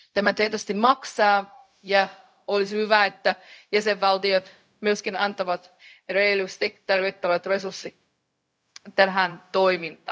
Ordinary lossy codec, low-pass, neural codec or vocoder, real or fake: none; none; codec, 16 kHz, 0.4 kbps, LongCat-Audio-Codec; fake